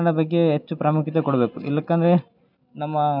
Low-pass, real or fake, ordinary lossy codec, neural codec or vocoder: 5.4 kHz; real; none; none